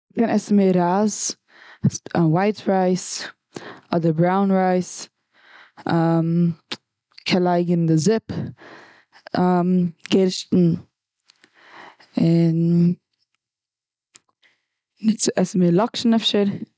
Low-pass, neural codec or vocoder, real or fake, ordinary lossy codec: none; none; real; none